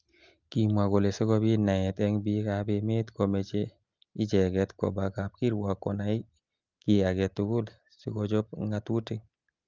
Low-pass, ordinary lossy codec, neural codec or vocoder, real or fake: 7.2 kHz; Opus, 24 kbps; none; real